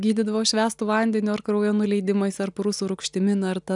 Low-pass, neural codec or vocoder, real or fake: 10.8 kHz; none; real